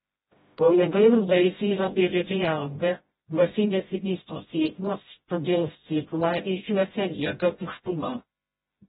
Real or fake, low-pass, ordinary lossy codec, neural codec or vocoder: fake; 7.2 kHz; AAC, 16 kbps; codec, 16 kHz, 0.5 kbps, FreqCodec, smaller model